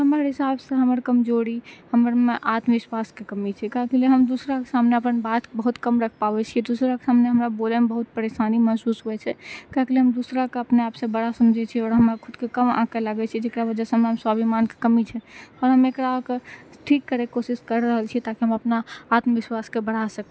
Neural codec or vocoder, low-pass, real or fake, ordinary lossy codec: none; none; real; none